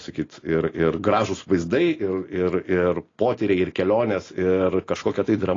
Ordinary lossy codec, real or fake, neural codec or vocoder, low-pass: AAC, 32 kbps; real; none; 7.2 kHz